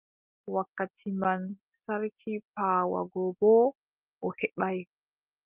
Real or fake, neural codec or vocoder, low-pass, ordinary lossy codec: real; none; 3.6 kHz; Opus, 24 kbps